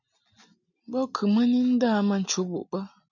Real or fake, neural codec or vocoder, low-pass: real; none; 7.2 kHz